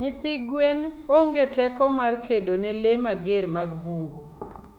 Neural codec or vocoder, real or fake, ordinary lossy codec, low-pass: autoencoder, 48 kHz, 32 numbers a frame, DAC-VAE, trained on Japanese speech; fake; none; 19.8 kHz